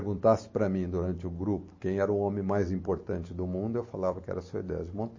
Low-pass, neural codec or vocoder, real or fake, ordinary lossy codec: 7.2 kHz; none; real; MP3, 32 kbps